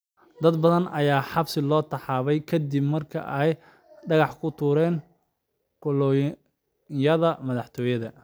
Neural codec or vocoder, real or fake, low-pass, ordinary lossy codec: none; real; none; none